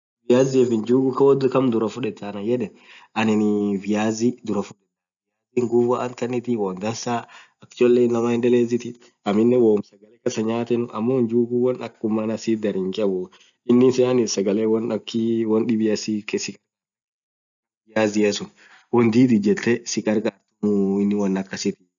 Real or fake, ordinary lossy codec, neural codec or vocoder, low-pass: real; none; none; 7.2 kHz